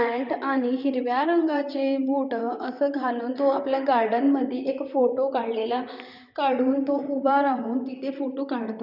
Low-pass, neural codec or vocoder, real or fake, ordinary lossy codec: 5.4 kHz; vocoder, 44.1 kHz, 128 mel bands, Pupu-Vocoder; fake; none